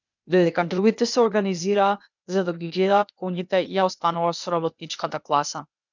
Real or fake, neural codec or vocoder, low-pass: fake; codec, 16 kHz, 0.8 kbps, ZipCodec; 7.2 kHz